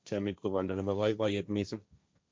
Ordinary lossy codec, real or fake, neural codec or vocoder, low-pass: none; fake; codec, 16 kHz, 1.1 kbps, Voila-Tokenizer; none